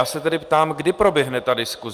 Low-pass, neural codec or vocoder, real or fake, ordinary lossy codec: 14.4 kHz; none; real; Opus, 32 kbps